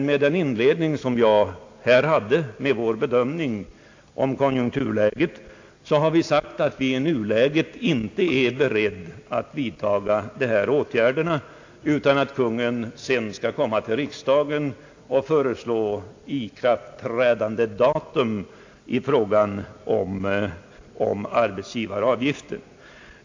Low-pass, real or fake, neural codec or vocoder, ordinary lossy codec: 7.2 kHz; real; none; AAC, 48 kbps